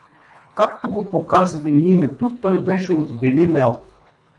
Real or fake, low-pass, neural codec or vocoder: fake; 10.8 kHz; codec, 24 kHz, 1.5 kbps, HILCodec